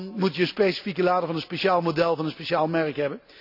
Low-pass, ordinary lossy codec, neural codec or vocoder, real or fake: 5.4 kHz; none; none; real